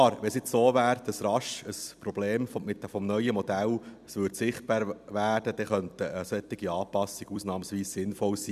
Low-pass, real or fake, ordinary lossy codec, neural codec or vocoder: 14.4 kHz; fake; none; vocoder, 44.1 kHz, 128 mel bands every 256 samples, BigVGAN v2